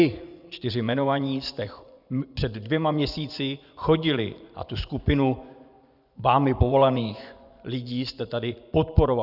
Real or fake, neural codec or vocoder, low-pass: fake; vocoder, 24 kHz, 100 mel bands, Vocos; 5.4 kHz